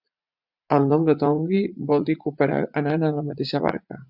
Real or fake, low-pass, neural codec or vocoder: fake; 5.4 kHz; vocoder, 44.1 kHz, 80 mel bands, Vocos